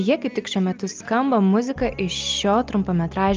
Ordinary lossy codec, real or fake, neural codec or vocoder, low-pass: Opus, 32 kbps; real; none; 7.2 kHz